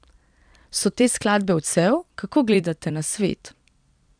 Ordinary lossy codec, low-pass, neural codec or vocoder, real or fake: none; 9.9 kHz; vocoder, 44.1 kHz, 128 mel bands every 256 samples, BigVGAN v2; fake